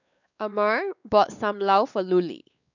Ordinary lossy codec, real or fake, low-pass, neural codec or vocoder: none; fake; 7.2 kHz; codec, 16 kHz, 4 kbps, X-Codec, WavLM features, trained on Multilingual LibriSpeech